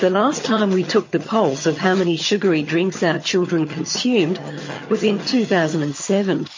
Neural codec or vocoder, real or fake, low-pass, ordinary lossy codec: vocoder, 22.05 kHz, 80 mel bands, HiFi-GAN; fake; 7.2 kHz; MP3, 32 kbps